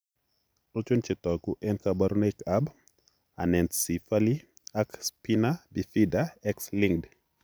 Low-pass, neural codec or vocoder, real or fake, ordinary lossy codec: none; none; real; none